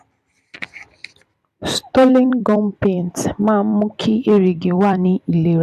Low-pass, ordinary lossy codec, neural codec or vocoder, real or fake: 14.4 kHz; none; vocoder, 44.1 kHz, 128 mel bands every 256 samples, BigVGAN v2; fake